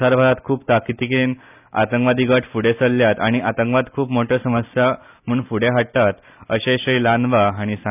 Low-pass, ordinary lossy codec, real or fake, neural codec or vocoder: 3.6 kHz; none; real; none